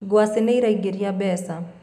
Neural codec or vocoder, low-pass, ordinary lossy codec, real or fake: none; 14.4 kHz; none; real